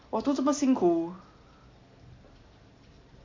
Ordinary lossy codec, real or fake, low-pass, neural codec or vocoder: MP3, 48 kbps; real; 7.2 kHz; none